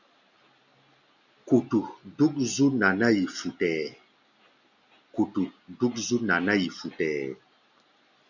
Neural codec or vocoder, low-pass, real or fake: none; 7.2 kHz; real